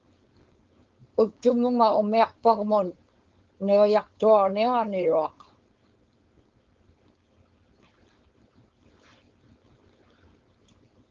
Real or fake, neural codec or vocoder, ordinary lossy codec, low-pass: fake; codec, 16 kHz, 4.8 kbps, FACodec; Opus, 32 kbps; 7.2 kHz